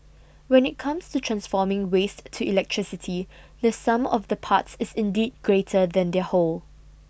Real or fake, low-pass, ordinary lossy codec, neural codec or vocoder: real; none; none; none